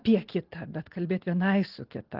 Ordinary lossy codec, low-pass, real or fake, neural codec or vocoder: Opus, 16 kbps; 5.4 kHz; real; none